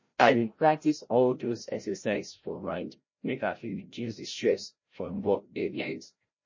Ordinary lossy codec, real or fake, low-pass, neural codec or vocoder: MP3, 32 kbps; fake; 7.2 kHz; codec, 16 kHz, 0.5 kbps, FreqCodec, larger model